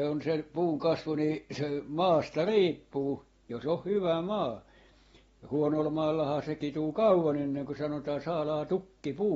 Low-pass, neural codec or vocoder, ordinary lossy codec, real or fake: 19.8 kHz; none; AAC, 24 kbps; real